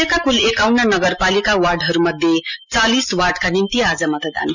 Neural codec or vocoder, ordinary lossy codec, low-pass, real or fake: none; none; 7.2 kHz; real